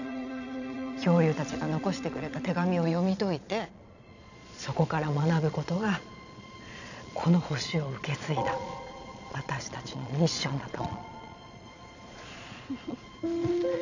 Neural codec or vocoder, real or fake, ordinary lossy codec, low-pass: vocoder, 22.05 kHz, 80 mel bands, Vocos; fake; none; 7.2 kHz